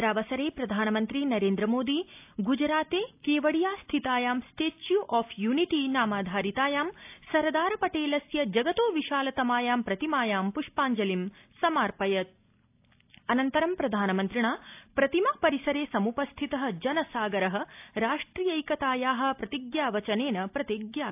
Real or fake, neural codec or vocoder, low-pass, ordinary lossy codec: real; none; 3.6 kHz; AAC, 32 kbps